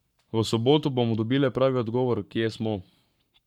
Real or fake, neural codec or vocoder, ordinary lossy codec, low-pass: fake; codec, 44.1 kHz, 7.8 kbps, Pupu-Codec; none; 19.8 kHz